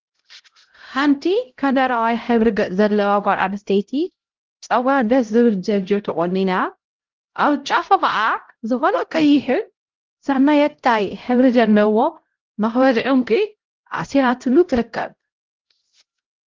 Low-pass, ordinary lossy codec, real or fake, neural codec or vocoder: 7.2 kHz; Opus, 16 kbps; fake; codec, 16 kHz, 0.5 kbps, X-Codec, HuBERT features, trained on LibriSpeech